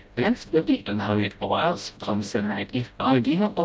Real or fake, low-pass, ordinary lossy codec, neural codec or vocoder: fake; none; none; codec, 16 kHz, 0.5 kbps, FreqCodec, smaller model